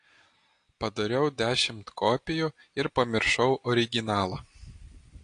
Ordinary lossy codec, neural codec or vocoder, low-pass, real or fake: AAC, 48 kbps; none; 9.9 kHz; real